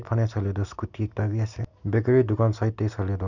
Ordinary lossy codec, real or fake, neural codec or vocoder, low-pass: none; real; none; 7.2 kHz